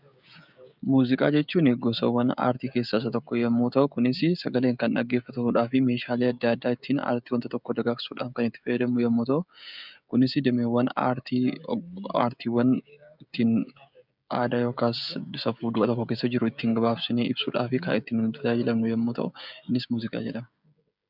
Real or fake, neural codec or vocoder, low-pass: fake; codec, 16 kHz, 16 kbps, FreqCodec, smaller model; 5.4 kHz